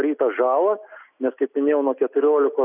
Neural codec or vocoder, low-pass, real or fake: none; 3.6 kHz; real